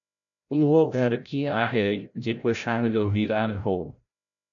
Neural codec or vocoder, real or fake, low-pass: codec, 16 kHz, 0.5 kbps, FreqCodec, larger model; fake; 7.2 kHz